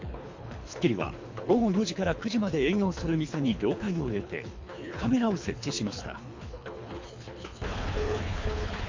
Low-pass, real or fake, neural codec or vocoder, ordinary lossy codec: 7.2 kHz; fake; codec, 24 kHz, 3 kbps, HILCodec; MP3, 48 kbps